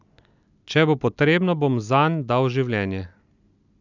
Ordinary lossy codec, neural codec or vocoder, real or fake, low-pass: none; none; real; 7.2 kHz